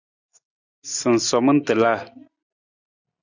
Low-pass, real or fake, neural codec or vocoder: 7.2 kHz; real; none